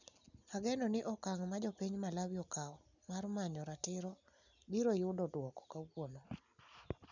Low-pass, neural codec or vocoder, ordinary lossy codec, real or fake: 7.2 kHz; none; none; real